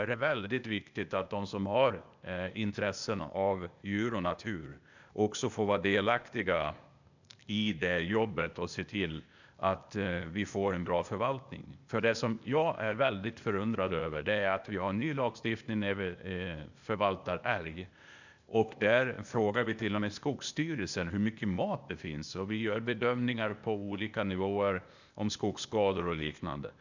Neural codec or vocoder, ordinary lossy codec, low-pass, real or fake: codec, 16 kHz, 0.8 kbps, ZipCodec; none; 7.2 kHz; fake